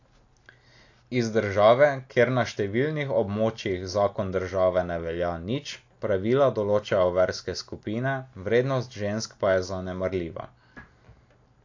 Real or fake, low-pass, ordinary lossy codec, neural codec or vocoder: real; 7.2 kHz; AAC, 48 kbps; none